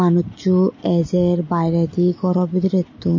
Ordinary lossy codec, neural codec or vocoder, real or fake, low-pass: MP3, 32 kbps; none; real; 7.2 kHz